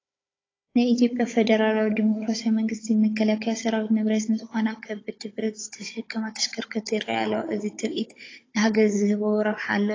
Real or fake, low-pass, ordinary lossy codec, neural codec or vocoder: fake; 7.2 kHz; AAC, 32 kbps; codec, 16 kHz, 16 kbps, FunCodec, trained on Chinese and English, 50 frames a second